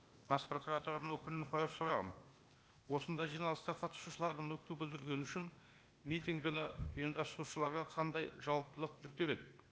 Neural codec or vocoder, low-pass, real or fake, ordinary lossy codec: codec, 16 kHz, 0.8 kbps, ZipCodec; none; fake; none